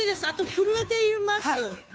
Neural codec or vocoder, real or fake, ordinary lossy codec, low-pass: codec, 16 kHz, 2 kbps, FunCodec, trained on Chinese and English, 25 frames a second; fake; none; none